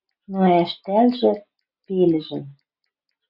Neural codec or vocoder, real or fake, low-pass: none; real; 5.4 kHz